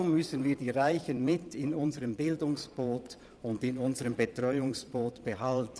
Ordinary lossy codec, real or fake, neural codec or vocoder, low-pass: none; fake; vocoder, 22.05 kHz, 80 mel bands, Vocos; none